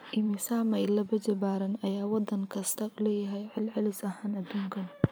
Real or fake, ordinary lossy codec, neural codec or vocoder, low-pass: fake; none; vocoder, 44.1 kHz, 128 mel bands every 512 samples, BigVGAN v2; none